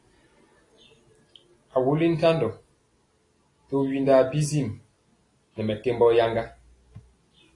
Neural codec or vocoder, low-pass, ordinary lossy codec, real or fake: none; 10.8 kHz; AAC, 32 kbps; real